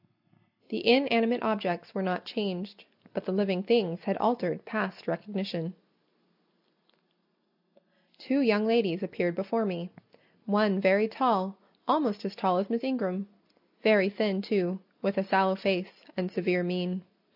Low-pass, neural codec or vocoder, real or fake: 5.4 kHz; none; real